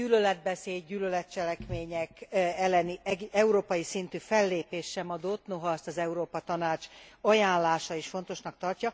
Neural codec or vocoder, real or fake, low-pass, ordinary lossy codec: none; real; none; none